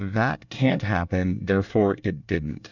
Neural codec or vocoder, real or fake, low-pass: codec, 24 kHz, 1 kbps, SNAC; fake; 7.2 kHz